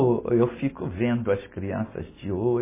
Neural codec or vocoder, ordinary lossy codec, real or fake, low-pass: none; MP3, 16 kbps; real; 3.6 kHz